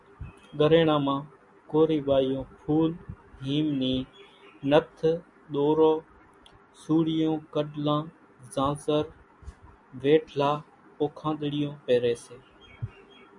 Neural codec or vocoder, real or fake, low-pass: none; real; 10.8 kHz